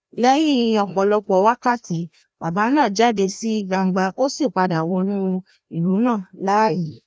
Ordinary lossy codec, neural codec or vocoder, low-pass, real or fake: none; codec, 16 kHz, 1 kbps, FreqCodec, larger model; none; fake